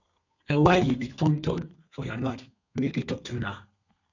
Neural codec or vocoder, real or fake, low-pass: codec, 32 kHz, 1.9 kbps, SNAC; fake; 7.2 kHz